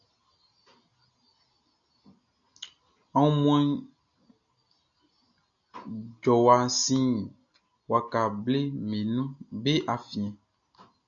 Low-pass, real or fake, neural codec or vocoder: 7.2 kHz; real; none